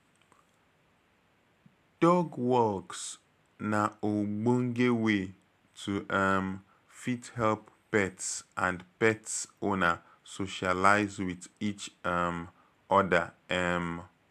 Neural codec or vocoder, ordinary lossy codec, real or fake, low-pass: none; AAC, 96 kbps; real; 14.4 kHz